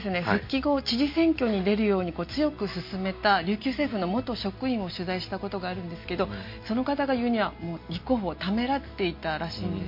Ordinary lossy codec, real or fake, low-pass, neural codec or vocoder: MP3, 32 kbps; real; 5.4 kHz; none